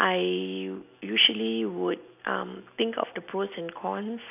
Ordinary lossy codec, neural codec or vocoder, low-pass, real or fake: none; none; 3.6 kHz; real